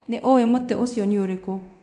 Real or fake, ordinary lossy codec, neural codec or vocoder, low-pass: fake; none; codec, 24 kHz, 0.9 kbps, DualCodec; 10.8 kHz